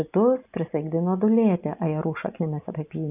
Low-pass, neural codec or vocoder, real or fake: 3.6 kHz; none; real